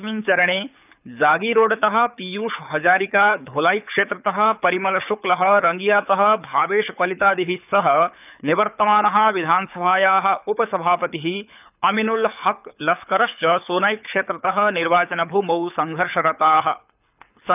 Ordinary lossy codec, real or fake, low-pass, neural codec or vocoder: none; fake; 3.6 kHz; codec, 24 kHz, 6 kbps, HILCodec